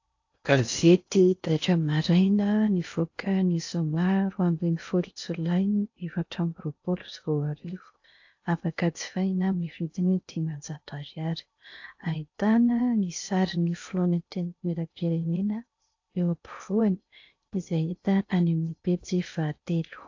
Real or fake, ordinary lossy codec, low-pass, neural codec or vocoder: fake; AAC, 48 kbps; 7.2 kHz; codec, 16 kHz in and 24 kHz out, 0.6 kbps, FocalCodec, streaming, 4096 codes